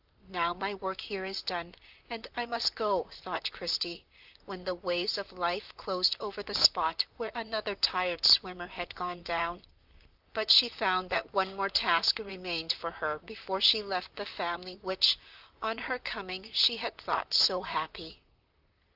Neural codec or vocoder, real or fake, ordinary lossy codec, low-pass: vocoder, 44.1 kHz, 128 mel bands, Pupu-Vocoder; fake; Opus, 32 kbps; 5.4 kHz